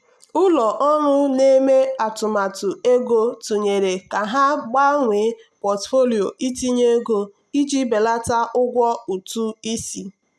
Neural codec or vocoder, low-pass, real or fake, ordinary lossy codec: none; none; real; none